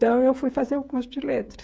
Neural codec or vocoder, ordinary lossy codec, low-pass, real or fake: codec, 16 kHz, 8 kbps, FreqCodec, smaller model; none; none; fake